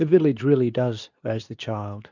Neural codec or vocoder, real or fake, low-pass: codec, 24 kHz, 0.9 kbps, WavTokenizer, medium speech release version 2; fake; 7.2 kHz